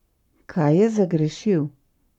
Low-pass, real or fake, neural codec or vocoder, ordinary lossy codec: 19.8 kHz; fake; codec, 44.1 kHz, 7.8 kbps, Pupu-Codec; none